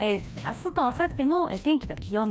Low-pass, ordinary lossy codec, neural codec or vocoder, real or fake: none; none; codec, 16 kHz, 1 kbps, FreqCodec, larger model; fake